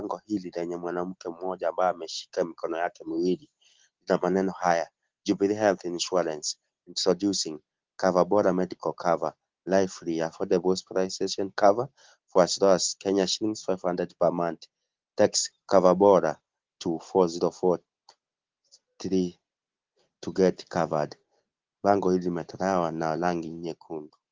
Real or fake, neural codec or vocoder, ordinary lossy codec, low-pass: real; none; Opus, 16 kbps; 7.2 kHz